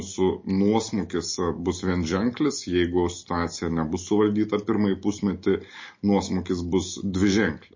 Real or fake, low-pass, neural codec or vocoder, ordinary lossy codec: real; 7.2 kHz; none; MP3, 32 kbps